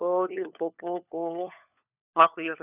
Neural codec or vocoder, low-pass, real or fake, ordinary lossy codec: codec, 16 kHz, 4 kbps, FunCodec, trained on LibriTTS, 50 frames a second; 3.6 kHz; fake; none